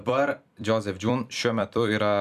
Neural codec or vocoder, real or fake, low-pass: vocoder, 44.1 kHz, 128 mel bands every 256 samples, BigVGAN v2; fake; 14.4 kHz